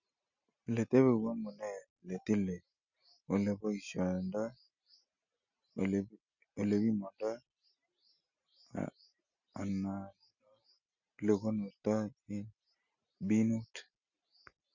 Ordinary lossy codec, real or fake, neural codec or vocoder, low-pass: none; real; none; 7.2 kHz